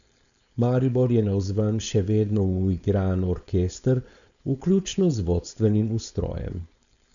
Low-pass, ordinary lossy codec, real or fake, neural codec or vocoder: 7.2 kHz; none; fake; codec, 16 kHz, 4.8 kbps, FACodec